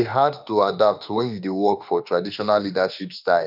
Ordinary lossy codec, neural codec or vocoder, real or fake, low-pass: none; autoencoder, 48 kHz, 32 numbers a frame, DAC-VAE, trained on Japanese speech; fake; 5.4 kHz